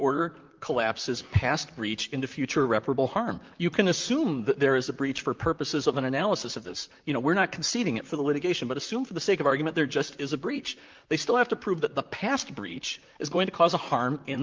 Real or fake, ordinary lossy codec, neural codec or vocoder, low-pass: fake; Opus, 24 kbps; vocoder, 44.1 kHz, 128 mel bands, Pupu-Vocoder; 7.2 kHz